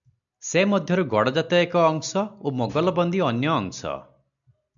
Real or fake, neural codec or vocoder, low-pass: real; none; 7.2 kHz